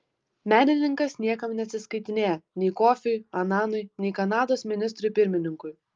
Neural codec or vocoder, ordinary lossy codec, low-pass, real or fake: none; Opus, 32 kbps; 7.2 kHz; real